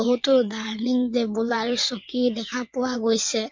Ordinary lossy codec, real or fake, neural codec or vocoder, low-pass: MP3, 48 kbps; fake; vocoder, 22.05 kHz, 80 mel bands, WaveNeXt; 7.2 kHz